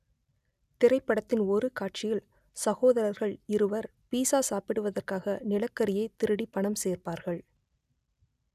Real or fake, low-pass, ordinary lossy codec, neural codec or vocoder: real; 14.4 kHz; none; none